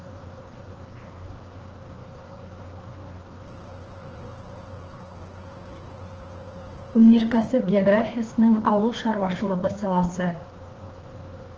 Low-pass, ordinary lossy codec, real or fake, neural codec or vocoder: 7.2 kHz; Opus, 16 kbps; fake; codec, 16 kHz, 2 kbps, FreqCodec, larger model